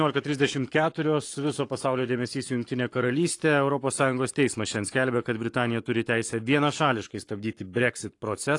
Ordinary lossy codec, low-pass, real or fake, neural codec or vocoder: AAC, 48 kbps; 10.8 kHz; fake; codec, 44.1 kHz, 7.8 kbps, Pupu-Codec